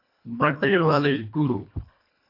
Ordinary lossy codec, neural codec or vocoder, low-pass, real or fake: AAC, 32 kbps; codec, 24 kHz, 1.5 kbps, HILCodec; 5.4 kHz; fake